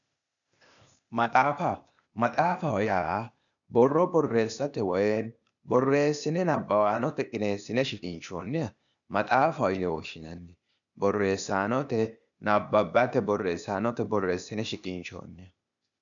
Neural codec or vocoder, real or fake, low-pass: codec, 16 kHz, 0.8 kbps, ZipCodec; fake; 7.2 kHz